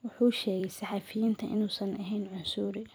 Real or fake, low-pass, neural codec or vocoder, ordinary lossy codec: fake; none; vocoder, 44.1 kHz, 128 mel bands every 256 samples, BigVGAN v2; none